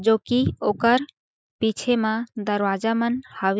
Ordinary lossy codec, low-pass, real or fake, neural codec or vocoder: none; none; real; none